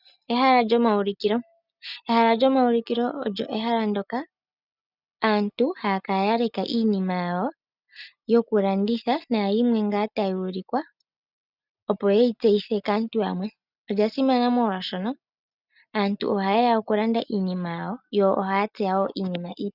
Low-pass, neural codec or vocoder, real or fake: 5.4 kHz; none; real